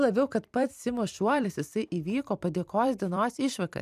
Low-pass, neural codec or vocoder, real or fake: 14.4 kHz; vocoder, 44.1 kHz, 128 mel bands every 256 samples, BigVGAN v2; fake